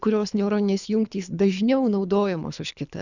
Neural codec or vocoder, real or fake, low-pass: codec, 24 kHz, 3 kbps, HILCodec; fake; 7.2 kHz